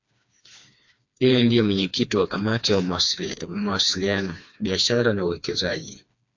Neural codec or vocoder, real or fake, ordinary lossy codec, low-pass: codec, 16 kHz, 2 kbps, FreqCodec, smaller model; fake; AAC, 48 kbps; 7.2 kHz